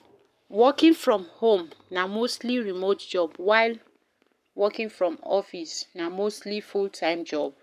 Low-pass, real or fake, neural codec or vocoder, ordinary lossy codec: 14.4 kHz; fake; codec, 44.1 kHz, 7.8 kbps, Pupu-Codec; none